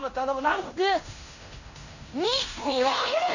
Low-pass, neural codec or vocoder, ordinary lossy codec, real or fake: 7.2 kHz; codec, 16 kHz in and 24 kHz out, 0.9 kbps, LongCat-Audio-Codec, fine tuned four codebook decoder; none; fake